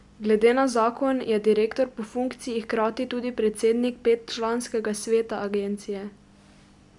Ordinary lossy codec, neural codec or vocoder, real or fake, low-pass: none; none; real; 10.8 kHz